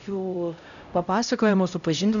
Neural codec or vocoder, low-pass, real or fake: codec, 16 kHz, 0.5 kbps, X-Codec, HuBERT features, trained on LibriSpeech; 7.2 kHz; fake